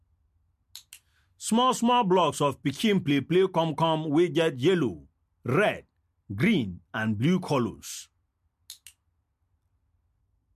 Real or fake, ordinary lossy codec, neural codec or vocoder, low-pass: real; MP3, 64 kbps; none; 14.4 kHz